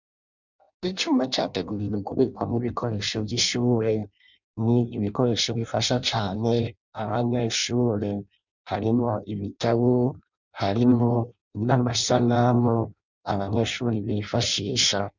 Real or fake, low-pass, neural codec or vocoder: fake; 7.2 kHz; codec, 16 kHz in and 24 kHz out, 0.6 kbps, FireRedTTS-2 codec